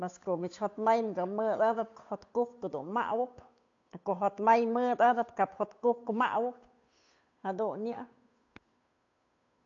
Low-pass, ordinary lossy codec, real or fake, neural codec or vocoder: 7.2 kHz; AAC, 48 kbps; fake; codec, 16 kHz, 8 kbps, FunCodec, trained on Chinese and English, 25 frames a second